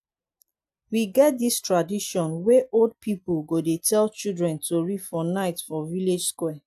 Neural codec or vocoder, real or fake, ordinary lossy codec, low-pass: none; real; AAC, 96 kbps; 14.4 kHz